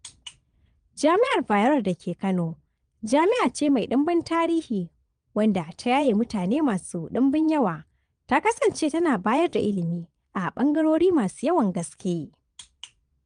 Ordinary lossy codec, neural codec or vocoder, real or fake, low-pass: Opus, 32 kbps; vocoder, 22.05 kHz, 80 mel bands, Vocos; fake; 9.9 kHz